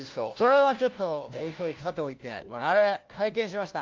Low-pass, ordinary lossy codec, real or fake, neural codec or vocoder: 7.2 kHz; Opus, 32 kbps; fake; codec, 16 kHz, 1 kbps, FunCodec, trained on LibriTTS, 50 frames a second